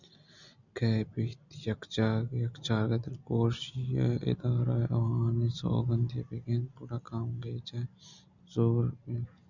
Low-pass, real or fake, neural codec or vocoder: 7.2 kHz; real; none